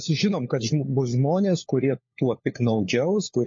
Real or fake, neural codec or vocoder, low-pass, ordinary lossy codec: fake; codec, 16 kHz, 4 kbps, FunCodec, trained on LibriTTS, 50 frames a second; 7.2 kHz; MP3, 32 kbps